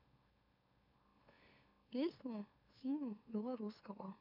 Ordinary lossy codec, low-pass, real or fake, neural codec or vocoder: none; 5.4 kHz; fake; autoencoder, 44.1 kHz, a latent of 192 numbers a frame, MeloTTS